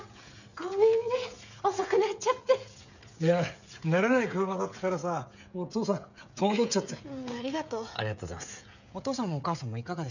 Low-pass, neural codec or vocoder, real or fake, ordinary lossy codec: 7.2 kHz; codec, 16 kHz, 16 kbps, FreqCodec, smaller model; fake; none